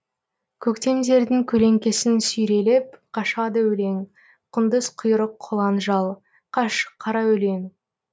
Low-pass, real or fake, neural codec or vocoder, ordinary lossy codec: none; real; none; none